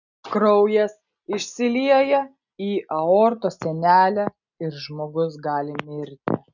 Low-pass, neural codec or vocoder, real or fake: 7.2 kHz; none; real